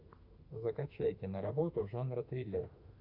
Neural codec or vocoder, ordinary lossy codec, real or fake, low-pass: autoencoder, 48 kHz, 32 numbers a frame, DAC-VAE, trained on Japanese speech; Opus, 64 kbps; fake; 5.4 kHz